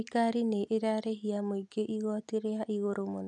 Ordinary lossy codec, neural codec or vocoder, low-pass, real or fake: none; none; 10.8 kHz; real